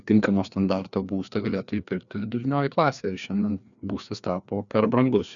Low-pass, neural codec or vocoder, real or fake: 7.2 kHz; codec, 16 kHz, 2 kbps, FreqCodec, larger model; fake